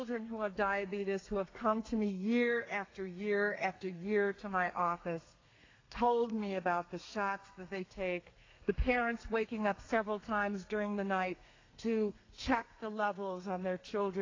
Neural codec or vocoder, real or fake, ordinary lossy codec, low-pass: codec, 32 kHz, 1.9 kbps, SNAC; fake; AAC, 32 kbps; 7.2 kHz